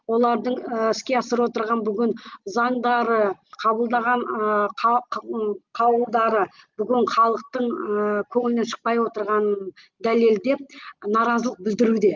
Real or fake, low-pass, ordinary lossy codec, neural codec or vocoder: real; 7.2 kHz; Opus, 24 kbps; none